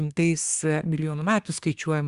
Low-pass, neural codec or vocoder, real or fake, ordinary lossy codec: 10.8 kHz; codec, 24 kHz, 1 kbps, SNAC; fake; Opus, 64 kbps